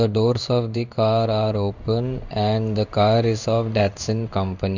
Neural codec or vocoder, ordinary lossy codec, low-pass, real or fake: codec, 16 kHz in and 24 kHz out, 1 kbps, XY-Tokenizer; none; 7.2 kHz; fake